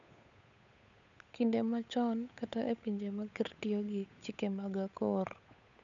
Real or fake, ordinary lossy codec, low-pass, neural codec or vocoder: fake; none; 7.2 kHz; codec, 16 kHz, 8 kbps, FunCodec, trained on Chinese and English, 25 frames a second